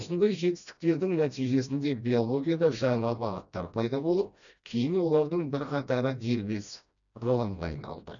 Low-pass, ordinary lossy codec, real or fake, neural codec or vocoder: 7.2 kHz; none; fake; codec, 16 kHz, 1 kbps, FreqCodec, smaller model